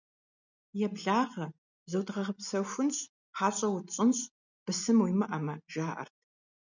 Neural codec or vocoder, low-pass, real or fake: none; 7.2 kHz; real